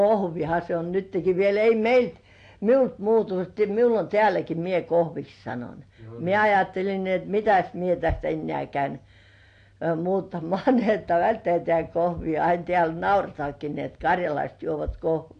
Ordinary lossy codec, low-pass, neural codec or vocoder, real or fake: AAC, 48 kbps; 9.9 kHz; none; real